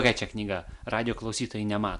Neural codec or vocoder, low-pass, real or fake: none; 10.8 kHz; real